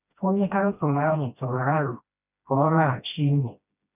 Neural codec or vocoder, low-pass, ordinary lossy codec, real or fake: codec, 16 kHz, 1 kbps, FreqCodec, smaller model; 3.6 kHz; none; fake